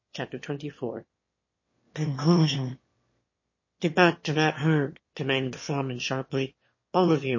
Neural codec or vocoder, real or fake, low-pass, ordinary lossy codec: autoencoder, 22.05 kHz, a latent of 192 numbers a frame, VITS, trained on one speaker; fake; 7.2 kHz; MP3, 32 kbps